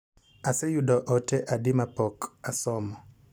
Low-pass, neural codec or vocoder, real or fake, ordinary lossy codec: none; none; real; none